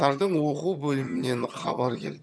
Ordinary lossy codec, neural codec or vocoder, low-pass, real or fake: none; vocoder, 22.05 kHz, 80 mel bands, HiFi-GAN; none; fake